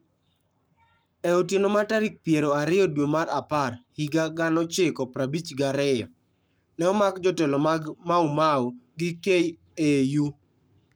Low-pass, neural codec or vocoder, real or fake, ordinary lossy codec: none; codec, 44.1 kHz, 7.8 kbps, Pupu-Codec; fake; none